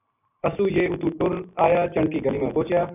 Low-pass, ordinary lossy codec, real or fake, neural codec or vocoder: 3.6 kHz; AAC, 32 kbps; real; none